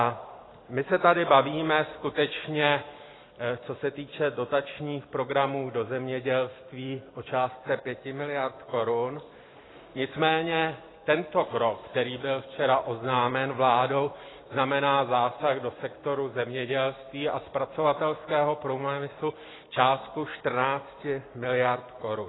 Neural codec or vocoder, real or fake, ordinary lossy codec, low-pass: none; real; AAC, 16 kbps; 7.2 kHz